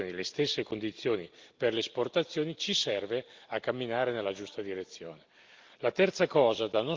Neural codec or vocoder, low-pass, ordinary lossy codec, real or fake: none; 7.2 kHz; Opus, 32 kbps; real